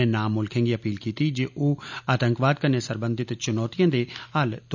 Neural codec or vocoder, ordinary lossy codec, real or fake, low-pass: none; none; real; 7.2 kHz